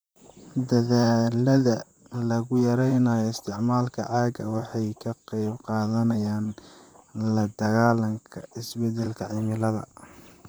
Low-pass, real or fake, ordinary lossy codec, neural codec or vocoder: none; fake; none; vocoder, 44.1 kHz, 128 mel bands, Pupu-Vocoder